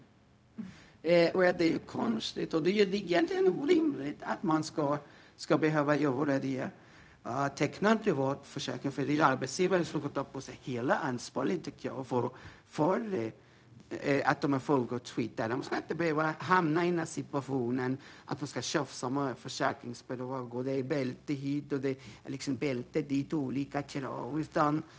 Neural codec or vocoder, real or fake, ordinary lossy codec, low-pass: codec, 16 kHz, 0.4 kbps, LongCat-Audio-Codec; fake; none; none